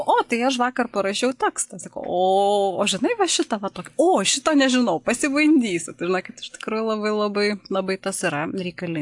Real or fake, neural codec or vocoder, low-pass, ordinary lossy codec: real; none; 10.8 kHz; AAC, 64 kbps